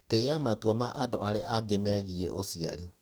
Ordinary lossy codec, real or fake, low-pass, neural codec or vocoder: none; fake; none; codec, 44.1 kHz, 2.6 kbps, DAC